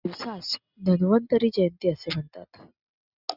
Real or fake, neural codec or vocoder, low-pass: real; none; 5.4 kHz